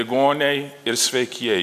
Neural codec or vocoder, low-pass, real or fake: none; 14.4 kHz; real